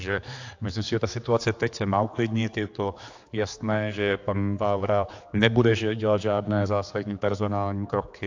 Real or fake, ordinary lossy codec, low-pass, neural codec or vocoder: fake; AAC, 48 kbps; 7.2 kHz; codec, 16 kHz, 4 kbps, X-Codec, HuBERT features, trained on general audio